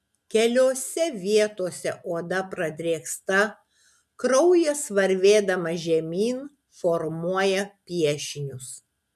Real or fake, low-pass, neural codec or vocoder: real; 14.4 kHz; none